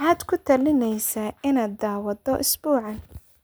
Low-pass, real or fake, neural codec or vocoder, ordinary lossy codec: none; real; none; none